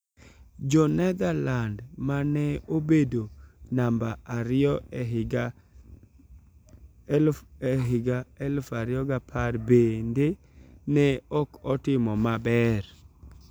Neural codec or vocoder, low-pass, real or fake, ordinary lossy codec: none; none; real; none